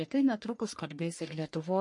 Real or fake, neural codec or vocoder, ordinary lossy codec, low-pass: fake; codec, 44.1 kHz, 1.7 kbps, Pupu-Codec; MP3, 48 kbps; 10.8 kHz